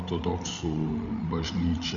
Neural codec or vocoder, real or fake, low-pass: codec, 16 kHz, 16 kbps, FreqCodec, larger model; fake; 7.2 kHz